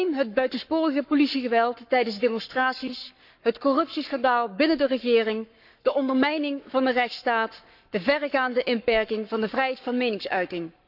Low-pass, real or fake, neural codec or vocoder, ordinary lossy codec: 5.4 kHz; fake; codec, 44.1 kHz, 7.8 kbps, Pupu-Codec; none